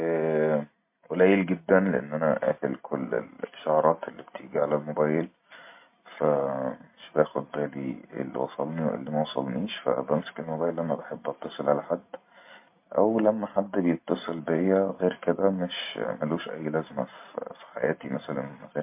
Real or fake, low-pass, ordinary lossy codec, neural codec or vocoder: real; 3.6 kHz; MP3, 24 kbps; none